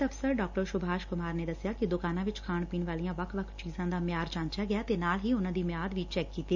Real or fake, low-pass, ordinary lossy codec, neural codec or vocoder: real; 7.2 kHz; none; none